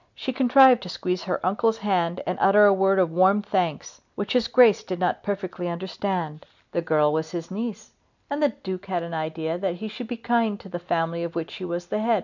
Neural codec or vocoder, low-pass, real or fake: none; 7.2 kHz; real